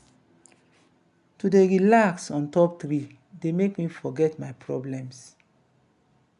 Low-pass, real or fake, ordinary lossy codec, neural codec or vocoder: 10.8 kHz; real; none; none